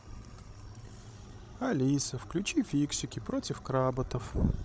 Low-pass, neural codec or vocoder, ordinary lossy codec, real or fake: none; codec, 16 kHz, 16 kbps, FreqCodec, larger model; none; fake